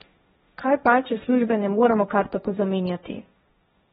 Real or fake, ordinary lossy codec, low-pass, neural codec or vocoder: fake; AAC, 16 kbps; 7.2 kHz; codec, 16 kHz, 1.1 kbps, Voila-Tokenizer